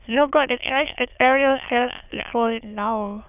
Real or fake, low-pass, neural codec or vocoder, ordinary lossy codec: fake; 3.6 kHz; autoencoder, 22.05 kHz, a latent of 192 numbers a frame, VITS, trained on many speakers; none